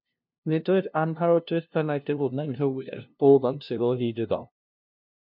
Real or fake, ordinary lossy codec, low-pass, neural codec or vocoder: fake; MP3, 48 kbps; 5.4 kHz; codec, 16 kHz, 0.5 kbps, FunCodec, trained on LibriTTS, 25 frames a second